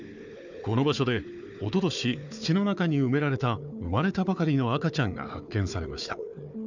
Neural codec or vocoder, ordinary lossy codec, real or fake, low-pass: codec, 16 kHz, 4 kbps, FunCodec, trained on Chinese and English, 50 frames a second; none; fake; 7.2 kHz